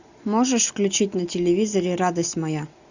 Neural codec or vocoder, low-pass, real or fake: none; 7.2 kHz; real